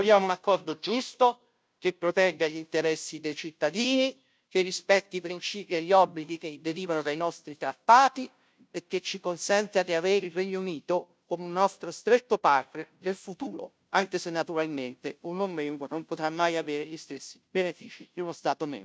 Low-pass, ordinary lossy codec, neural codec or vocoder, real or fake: none; none; codec, 16 kHz, 0.5 kbps, FunCodec, trained on Chinese and English, 25 frames a second; fake